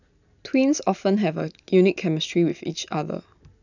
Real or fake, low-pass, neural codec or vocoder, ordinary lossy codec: real; 7.2 kHz; none; none